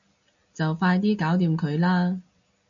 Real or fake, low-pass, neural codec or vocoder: real; 7.2 kHz; none